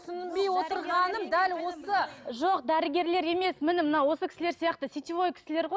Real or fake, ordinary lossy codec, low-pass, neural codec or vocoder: real; none; none; none